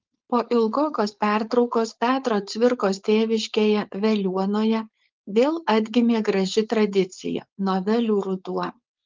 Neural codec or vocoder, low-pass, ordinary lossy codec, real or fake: codec, 16 kHz, 4.8 kbps, FACodec; 7.2 kHz; Opus, 24 kbps; fake